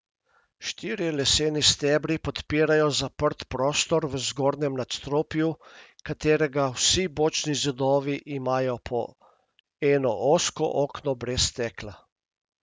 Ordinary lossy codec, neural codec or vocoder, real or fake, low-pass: none; none; real; none